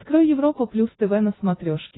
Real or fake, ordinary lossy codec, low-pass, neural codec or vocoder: real; AAC, 16 kbps; 7.2 kHz; none